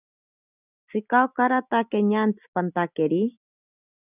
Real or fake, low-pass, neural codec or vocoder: real; 3.6 kHz; none